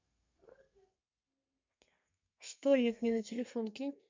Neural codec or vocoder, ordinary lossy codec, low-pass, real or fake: codec, 32 kHz, 1.9 kbps, SNAC; MP3, 64 kbps; 7.2 kHz; fake